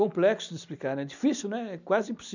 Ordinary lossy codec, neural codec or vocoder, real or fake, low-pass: none; none; real; 7.2 kHz